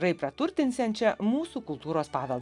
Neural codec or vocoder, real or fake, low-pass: none; real; 10.8 kHz